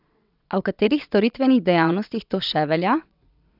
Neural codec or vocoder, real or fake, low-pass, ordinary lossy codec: vocoder, 22.05 kHz, 80 mel bands, WaveNeXt; fake; 5.4 kHz; none